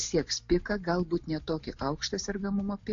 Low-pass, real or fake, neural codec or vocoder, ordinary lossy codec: 7.2 kHz; real; none; AAC, 48 kbps